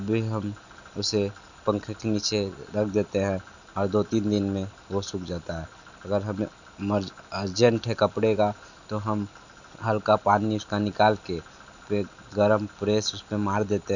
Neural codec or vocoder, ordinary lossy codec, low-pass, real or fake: none; none; 7.2 kHz; real